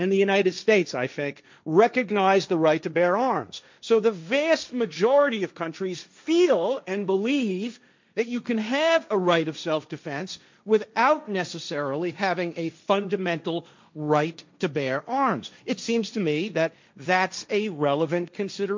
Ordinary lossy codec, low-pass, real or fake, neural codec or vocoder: MP3, 64 kbps; 7.2 kHz; fake; codec, 16 kHz, 1.1 kbps, Voila-Tokenizer